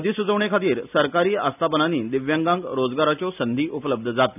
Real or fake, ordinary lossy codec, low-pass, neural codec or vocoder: real; none; 3.6 kHz; none